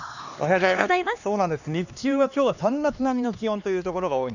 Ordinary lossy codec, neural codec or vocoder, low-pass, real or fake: none; codec, 16 kHz, 2 kbps, X-Codec, HuBERT features, trained on LibriSpeech; 7.2 kHz; fake